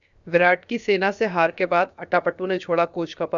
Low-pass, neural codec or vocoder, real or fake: 7.2 kHz; codec, 16 kHz, about 1 kbps, DyCAST, with the encoder's durations; fake